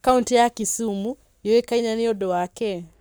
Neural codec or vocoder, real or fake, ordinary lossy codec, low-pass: codec, 44.1 kHz, 7.8 kbps, Pupu-Codec; fake; none; none